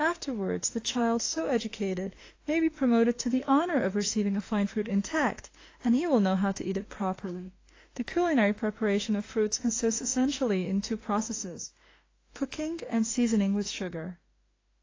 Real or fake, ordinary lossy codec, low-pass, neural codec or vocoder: fake; AAC, 32 kbps; 7.2 kHz; autoencoder, 48 kHz, 32 numbers a frame, DAC-VAE, trained on Japanese speech